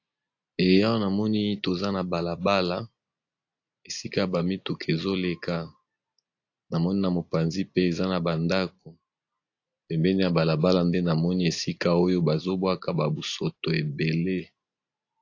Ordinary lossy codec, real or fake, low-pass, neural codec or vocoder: AAC, 48 kbps; real; 7.2 kHz; none